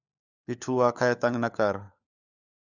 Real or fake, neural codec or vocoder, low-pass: fake; codec, 16 kHz, 4 kbps, FunCodec, trained on LibriTTS, 50 frames a second; 7.2 kHz